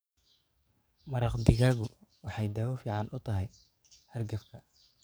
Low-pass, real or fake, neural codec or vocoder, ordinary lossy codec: none; fake; codec, 44.1 kHz, 7.8 kbps, DAC; none